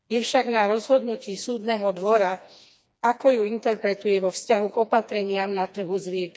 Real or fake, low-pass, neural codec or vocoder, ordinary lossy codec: fake; none; codec, 16 kHz, 2 kbps, FreqCodec, smaller model; none